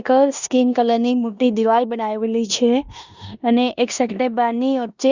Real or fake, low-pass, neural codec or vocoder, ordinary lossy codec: fake; 7.2 kHz; codec, 16 kHz in and 24 kHz out, 0.9 kbps, LongCat-Audio-Codec, four codebook decoder; Opus, 64 kbps